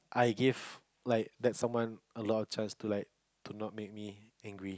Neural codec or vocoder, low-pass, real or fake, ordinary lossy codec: none; none; real; none